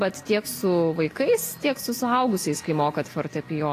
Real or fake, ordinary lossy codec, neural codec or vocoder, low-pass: real; AAC, 48 kbps; none; 14.4 kHz